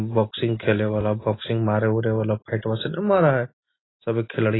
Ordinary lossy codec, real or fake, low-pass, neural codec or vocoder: AAC, 16 kbps; real; 7.2 kHz; none